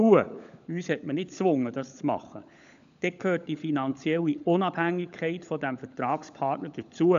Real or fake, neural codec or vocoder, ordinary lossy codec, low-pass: fake; codec, 16 kHz, 16 kbps, FunCodec, trained on LibriTTS, 50 frames a second; none; 7.2 kHz